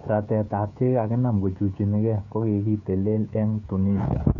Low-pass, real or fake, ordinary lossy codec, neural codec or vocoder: 7.2 kHz; fake; none; codec, 16 kHz, 16 kbps, FreqCodec, smaller model